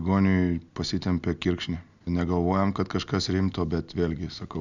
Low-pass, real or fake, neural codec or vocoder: 7.2 kHz; real; none